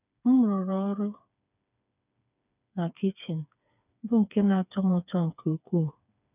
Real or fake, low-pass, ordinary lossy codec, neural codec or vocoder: fake; 3.6 kHz; none; codec, 16 kHz, 4 kbps, FreqCodec, smaller model